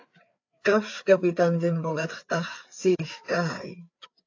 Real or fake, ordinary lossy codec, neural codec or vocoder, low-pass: fake; AAC, 48 kbps; codec, 16 kHz, 4 kbps, FreqCodec, larger model; 7.2 kHz